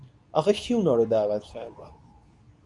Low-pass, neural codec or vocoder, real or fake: 10.8 kHz; codec, 24 kHz, 0.9 kbps, WavTokenizer, medium speech release version 2; fake